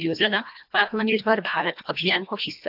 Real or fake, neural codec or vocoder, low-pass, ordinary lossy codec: fake; codec, 24 kHz, 1.5 kbps, HILCodec; 5.4 kHz; AAC, 48 kbps